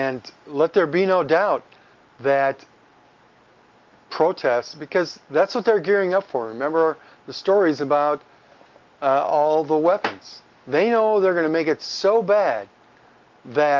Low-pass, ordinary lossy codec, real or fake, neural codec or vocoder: 7.2 kHz; Opus, 32 kbps; real; none